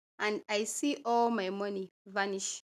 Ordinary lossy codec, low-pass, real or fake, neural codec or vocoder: none; 14.4 kHz; real; none